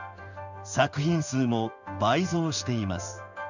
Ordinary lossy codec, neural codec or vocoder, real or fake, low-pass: none; codec, 16 kHz in and 24 kHz out, 1 kbps, XY-Tokenizer; fake; 7.2 kHz